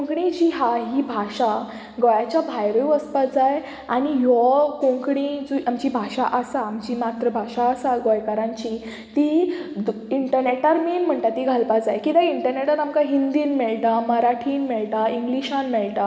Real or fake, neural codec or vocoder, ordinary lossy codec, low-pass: real; none; none; none